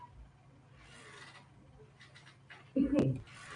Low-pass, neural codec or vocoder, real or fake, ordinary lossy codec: 9.9 kHz; none; real; AAC, 48 kbps